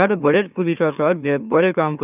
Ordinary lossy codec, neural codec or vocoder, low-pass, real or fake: none; autoencoder, 44.1 kHz, a latent of 192 numbers a frame, MeloTTS; 3.6 kHz; fake